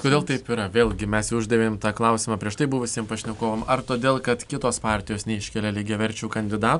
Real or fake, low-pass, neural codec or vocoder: real; 10.8 kHz; none